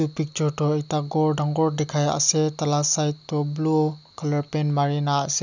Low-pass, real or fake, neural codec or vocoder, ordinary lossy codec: 7.2 kHz; real; none; none